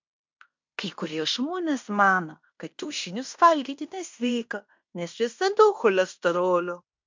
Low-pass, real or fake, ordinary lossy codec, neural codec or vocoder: 7.2 kHz; fake; MP3, 64 kbps; codec, 16 kHz in and 24 kHz out, 0.9 kbps, LongCat-Audio-Codec, fine tuned four codebook decoder